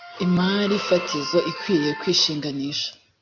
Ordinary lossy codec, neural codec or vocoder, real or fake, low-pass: MP3, 48 kbps; none; real; 7.2 kHz